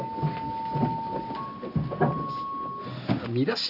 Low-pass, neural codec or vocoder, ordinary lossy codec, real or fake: 5.4 kHz; none; Opus, 64 kbps; real